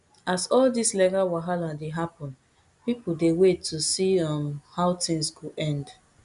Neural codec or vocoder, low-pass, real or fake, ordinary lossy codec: none; 10.8 kHz; real; none